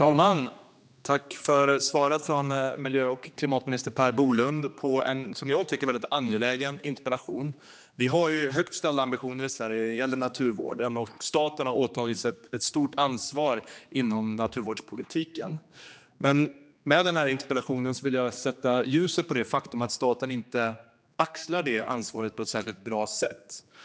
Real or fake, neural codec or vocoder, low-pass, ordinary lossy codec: fake; codec, 16 kHz, 2 kbps, X-Codec, HuBERT features, trained on general audio; none; none